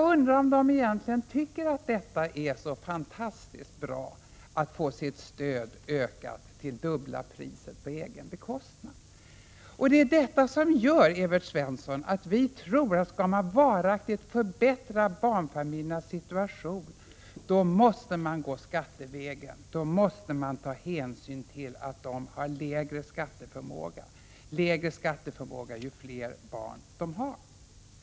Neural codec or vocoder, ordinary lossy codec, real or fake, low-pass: none; none; real; none